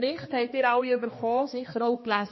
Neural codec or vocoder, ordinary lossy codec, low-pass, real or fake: codec, 16 kHz, 2 kbps, X-Codec, HuBERT features, trained on balanced general audio; MP3, 24 kbps; 7.2 kHz; fake